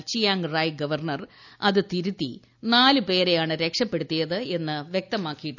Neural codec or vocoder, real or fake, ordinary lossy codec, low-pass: none; real; none; 7.2 kHz